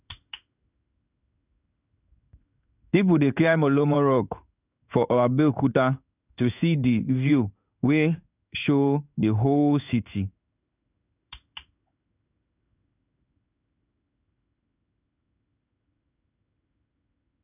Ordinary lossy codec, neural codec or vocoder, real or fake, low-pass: none; codec, 16 kHz in and 24 kHz out, 1 kbps, XY-Tokenizer; fake; 3.6 kHz